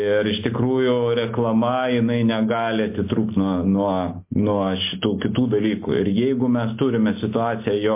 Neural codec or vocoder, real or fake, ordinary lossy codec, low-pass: none; real; MP3, 24 kbps; 3.6 kHz